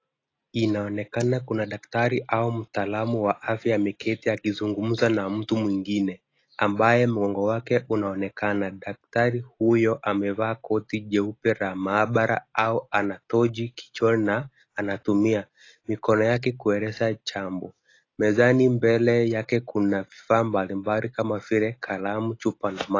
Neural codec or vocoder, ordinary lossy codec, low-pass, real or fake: none; AAC, 32 kbps; 7.2 kHz; real